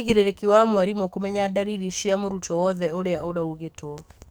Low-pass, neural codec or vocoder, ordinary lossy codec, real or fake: none; codec, 44.1 kHz, 2.6 kbps, SNAC; none; fake